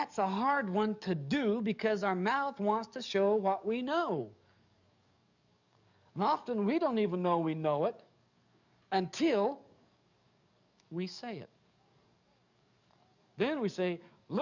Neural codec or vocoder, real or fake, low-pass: codec, 44.1 kHz, 7.8 kbps, DAC; fake; 7.2 kHz